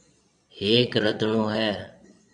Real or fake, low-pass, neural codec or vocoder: fake; 9.9 kHz; vocoder, 22.05 kHz, 80 mel bands, Vocos